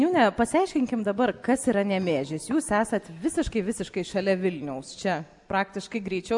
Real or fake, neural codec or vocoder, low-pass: real; none; 10.8 kHz